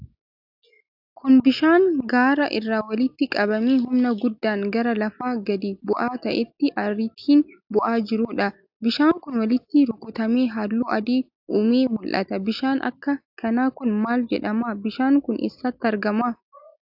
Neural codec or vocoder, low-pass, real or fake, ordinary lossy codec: none; 5.4 kHz; real; AAC, 48 kbps